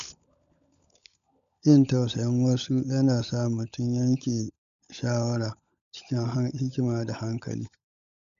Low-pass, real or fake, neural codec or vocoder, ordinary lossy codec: 7.2 kHz; fake; codec, 16 kHz, 16 kbps, FunCodec, trained on LibriTTS, 50 frames a second; none